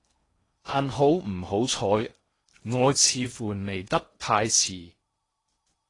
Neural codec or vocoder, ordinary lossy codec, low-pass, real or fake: codec, 16 kHz in and 24 kHz out, 0.8 kbps, FocalCodec, streaming, 65536 codes; AAC, 32 kbps; 10.8 kHz; fake